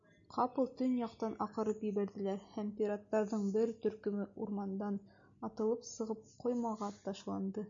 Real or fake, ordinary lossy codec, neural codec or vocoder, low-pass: real; MP3, 64 kbps; none; 7.2 kHz